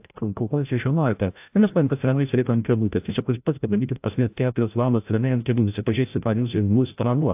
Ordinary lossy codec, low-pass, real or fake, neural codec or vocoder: AAC, 32 kbps; 3.6 kHz; fake; codec, 16 kHz, 0.5 kbps, FreqCodec, larger model